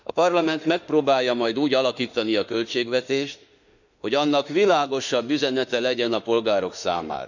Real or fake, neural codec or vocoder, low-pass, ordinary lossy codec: fake; autoencoder, 48 kHz, 32 numbers a frame, DAC-VAE, trained on Japanese speech; 7.2 kHz; none